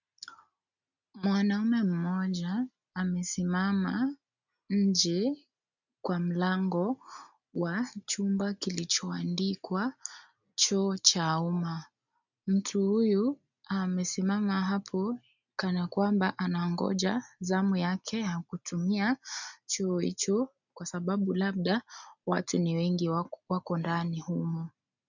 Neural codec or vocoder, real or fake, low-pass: none; real; 7.2 kHz